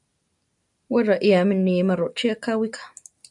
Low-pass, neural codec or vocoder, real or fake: 10.8 kHz; none; real